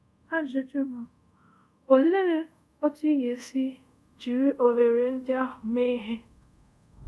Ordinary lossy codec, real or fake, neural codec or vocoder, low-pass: none; fake; codec, 24 kHz, 0.5 kbps, DualCodec; none